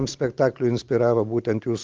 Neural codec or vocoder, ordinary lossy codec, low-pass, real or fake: none; Opus, 32 kbps; 7.2 kHz; real